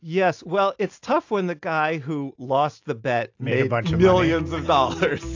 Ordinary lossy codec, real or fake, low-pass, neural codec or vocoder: AAC, 48 kbps; real; 7.2 kHz; none